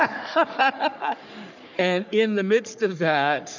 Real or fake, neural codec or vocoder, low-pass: fake; codec, 44.1 kHz, 3.4 kbps, Pupu-Codec; 7.2 kHz